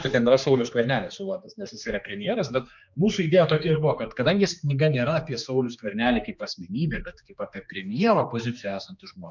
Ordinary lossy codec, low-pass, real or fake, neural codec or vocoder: MP3, 64 kbps; 7.2 kHz; fake; codec, 16 kHz, 2 kbps, X-Codec, HuBERT features, trained on general audio